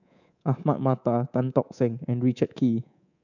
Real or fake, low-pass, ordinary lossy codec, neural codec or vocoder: fake; 7.2 kHz; none; codec, 24 kHz, 3.1 kbps, DualCodec